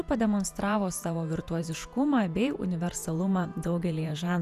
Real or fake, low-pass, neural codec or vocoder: fake; 14.4 kHz; vocoder, 48 kHz, 128 mel bands, Vocos